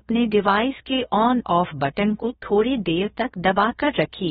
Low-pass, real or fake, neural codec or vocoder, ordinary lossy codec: 7.2 kHz; fake; codec, 16 kHz, about 1 kbps, DyCAST, with the encoder's durations; AAC, 16 kbps